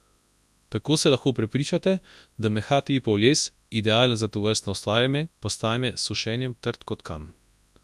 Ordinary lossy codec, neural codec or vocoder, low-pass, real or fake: none; codec, 24 kHz, 0.9 kbps, WavTokenizer, large speech release; none; fake